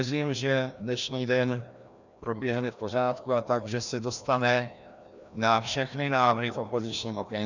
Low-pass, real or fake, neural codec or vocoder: 7.2 kHz; fake; codec, 16 kHz, 1 kbps, FreqCodec, larger model